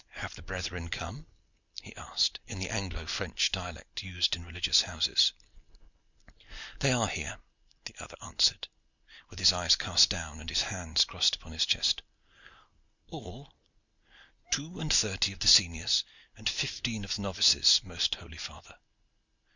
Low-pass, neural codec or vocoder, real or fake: 7.2 kHz; none; real